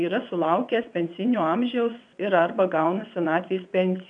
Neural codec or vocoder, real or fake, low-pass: vocoder, 22.05 kHz, 80 mel bands, WaveNeXt; fake; 9.9 kHz